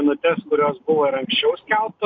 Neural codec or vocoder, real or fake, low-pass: none; real; 7.2 kHz